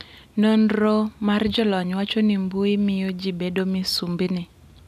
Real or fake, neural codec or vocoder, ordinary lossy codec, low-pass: real; none; none; 14.4 kHz